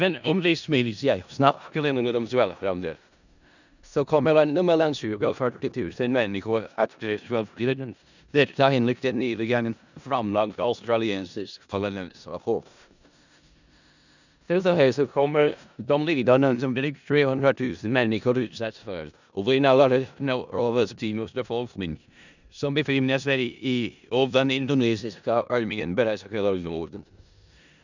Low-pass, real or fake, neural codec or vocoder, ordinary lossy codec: 7.2 kHz; fake; codec, 16 kHz in and 24 kHz out, 0.4 kbps, LongCat-Audio-Codec, four codebook decoder; none